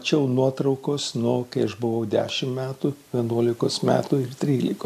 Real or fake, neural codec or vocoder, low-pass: real; none; 14.4 kHz